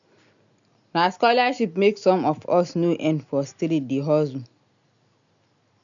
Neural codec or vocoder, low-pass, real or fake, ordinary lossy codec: none; 7.2 kHz; real; none